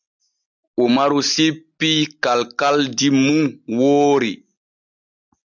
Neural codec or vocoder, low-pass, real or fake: none; 7.2 kHz; real